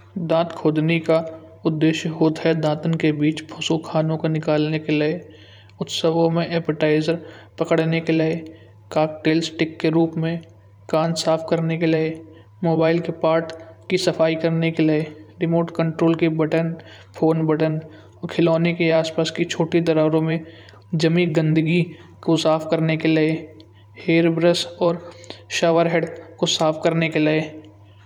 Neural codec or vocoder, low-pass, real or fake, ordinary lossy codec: vocoder, 44.1 kHz, 128 mel bands every 512 samples, BigVGAN v2; 19.8 kHz; fake; none